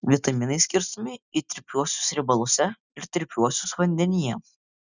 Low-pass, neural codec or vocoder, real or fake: 7.2 kHz; none; real